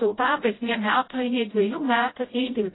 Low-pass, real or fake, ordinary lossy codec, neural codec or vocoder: 7.2 kHz; fake; AAC, 16 kbps; codec, 16 kHz, 0.5 kbps, FreqCodec, smaller model